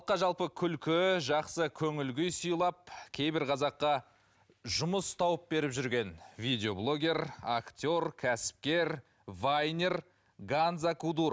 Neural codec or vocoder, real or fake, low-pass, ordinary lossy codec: none; real; none; none